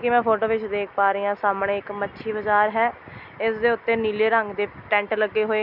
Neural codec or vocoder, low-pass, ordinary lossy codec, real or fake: none; 5.4 kHz; none; real